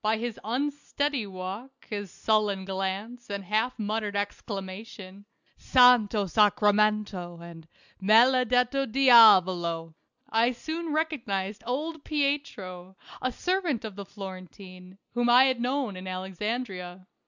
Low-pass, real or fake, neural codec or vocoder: 7.2 kHz; real; none